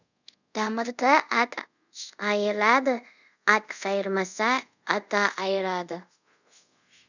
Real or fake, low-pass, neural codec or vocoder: fake; 7.2 kHz; codec, 24 kHz, 0.5 kbps, DualCodec